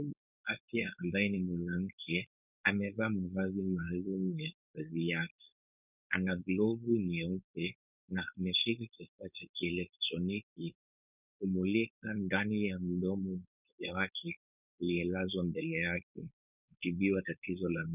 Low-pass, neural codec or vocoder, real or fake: 3.6 kHz; codec, 16 kHz, 4.8 kbps, FACodec; fake